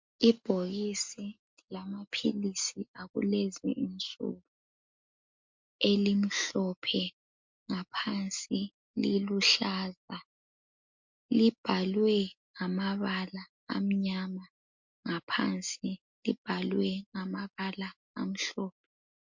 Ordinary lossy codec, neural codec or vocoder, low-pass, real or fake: MP3, 48 kbps; none; 7.2 kHz; real